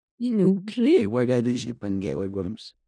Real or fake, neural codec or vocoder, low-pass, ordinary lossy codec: fake; codec, 16 kHz in and 24 kHz out, 0.4 kbps, LongCat-Audio-Codec, four codebook decoder; 9.9 kHz; none